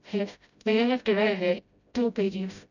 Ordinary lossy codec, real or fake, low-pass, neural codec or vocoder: none; fake; 7.2 kHz; codec, 16 kHz, 0.5 kbps, FreqCodec, smaller model